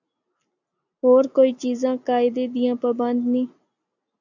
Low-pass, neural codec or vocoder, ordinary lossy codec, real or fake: 7.2 kHz; none; AAC, 48 kbps; real